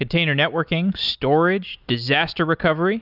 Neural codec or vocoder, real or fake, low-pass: none; real; 5.4 kHz